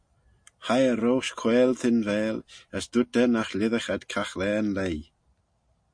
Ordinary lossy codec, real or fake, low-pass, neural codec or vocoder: MP3, 48 kbps; real; 9.9 kHz; none